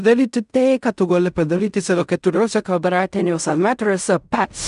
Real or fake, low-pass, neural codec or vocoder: fake; 10.8 kHz; codec, 16 kHz in and 24 kHz out, 0.4 kbps, LongCat-Audio-Codec, fine tuned four codebook decoder